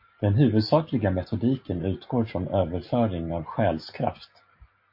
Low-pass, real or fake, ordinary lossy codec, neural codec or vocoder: 5.4 kHz; real; MP3, 32 kbps; none